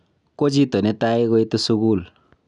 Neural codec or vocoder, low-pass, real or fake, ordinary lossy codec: none; 10.8 kHz; real; none